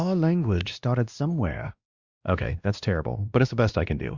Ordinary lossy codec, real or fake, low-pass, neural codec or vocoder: Opus, 64 kbps; fake; 7.2 kHz; codec, 16 kHz, 1 kbps, X-Codec, WavLM features, trained on Multilingual LibriSpeech